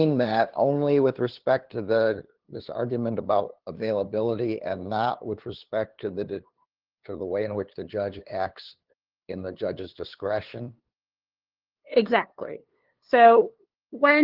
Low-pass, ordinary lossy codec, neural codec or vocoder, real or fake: 5.4 kHz; Opus, 16 kbps; codec, 16 kHz, 2 kbps, FunCodec, trained on LibriTTS, 25 frames a second; fake